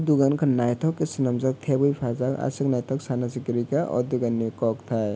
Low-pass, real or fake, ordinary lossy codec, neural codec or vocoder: none; real; none; none